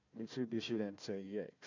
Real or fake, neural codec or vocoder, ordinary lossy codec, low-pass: fake; codec, 16 kHz, 1 kbps, FunCodec, trained on Chinese and English, 50 frames a second; MP3, 64 kbps; 7.2 kHz